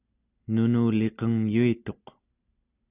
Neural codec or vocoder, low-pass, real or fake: none; 3.6 kHz; real